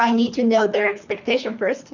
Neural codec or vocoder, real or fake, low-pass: codec, 24 kHz, 3 kbps, HILCodec; fake; 7.2 kHz